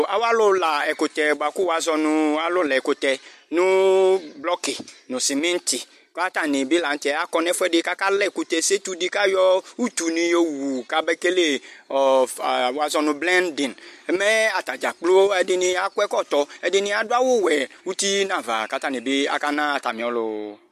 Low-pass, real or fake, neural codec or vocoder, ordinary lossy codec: 14.4 kHz; real; none; MP3, 64 kbps